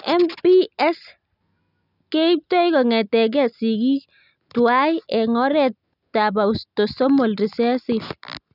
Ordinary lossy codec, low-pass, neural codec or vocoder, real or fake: none; 5.4 kHz; none; real